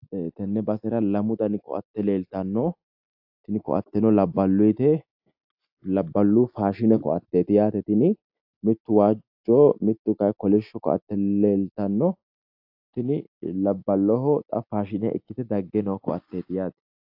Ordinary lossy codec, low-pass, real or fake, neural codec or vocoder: AAC, 48 kbps; 5.4 kHz; real; none